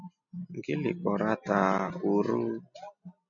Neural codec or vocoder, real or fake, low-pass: none; real; 7.2 kHz